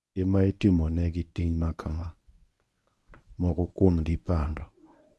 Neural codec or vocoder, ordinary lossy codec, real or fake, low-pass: codec, 24 kHz, 0.9 kbps, WavTokenizer, medium speech release version 1; none; fake; none